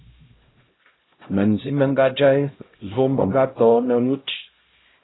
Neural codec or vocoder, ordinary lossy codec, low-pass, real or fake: codec, 16 kHz, 0.5 kbps, X-Codec, HuBERT features, trained on LibriSpeech; AAC, 16 kbps; 7.2 kHz; fake